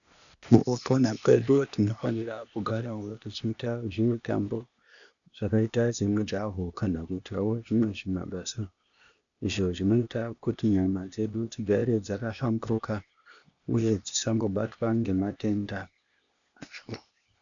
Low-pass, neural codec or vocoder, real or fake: 7.2 kHz; codec, 16 kHz, 0.8 kbps, ZipCodec; fake